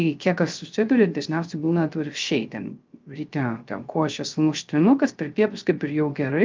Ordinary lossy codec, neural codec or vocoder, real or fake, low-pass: Opus, 24 kbps; codec, 16 kHz, 0.3 kbps, FocalCodec; fake; 7.2 kHz